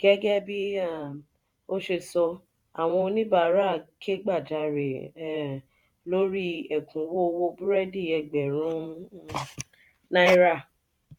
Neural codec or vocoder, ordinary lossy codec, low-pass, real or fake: vocoder, 44.1 kHz, 128 mel bands every 512 samples, BigVGAN v2; none; 19.8 kHz; fake